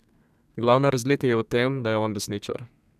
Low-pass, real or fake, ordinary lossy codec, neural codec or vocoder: 14.4 kHz; fake; none; codec, 32 kHz, 1.9 kbps, SNAC